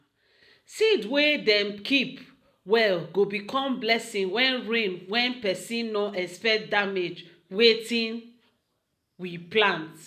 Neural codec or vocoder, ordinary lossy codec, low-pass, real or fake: none; none; 14.4 kHz; real